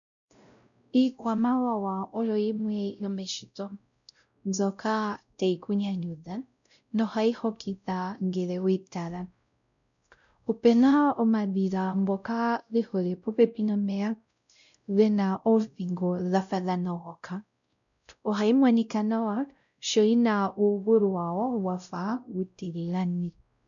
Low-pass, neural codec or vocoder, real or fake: 7.2 kHz; codec, 16 kHz, 0.5 kbps, X-Codec, WavLM features, trained on Multilingual LibriSpeech; fake